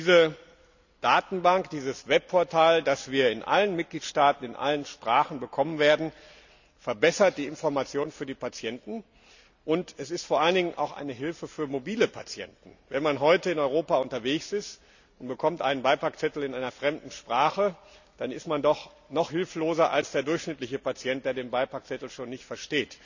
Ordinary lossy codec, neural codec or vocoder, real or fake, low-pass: none; none; real; 7.2 kHz